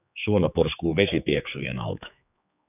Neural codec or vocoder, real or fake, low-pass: codec, 16 kHz, 4 kbps, X-Codec, HuBERT features, trained on general audio; fake; 3.6 kHz